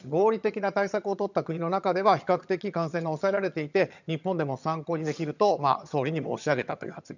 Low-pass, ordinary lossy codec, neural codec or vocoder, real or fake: 7.2 kHz; none; vocoder, 22.05 kHz, 80 mel bands, HiFi-GAN; fake